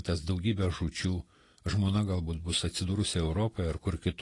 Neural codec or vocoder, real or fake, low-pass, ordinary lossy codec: none; real; 10.8 kHz; AAC, 32 kbps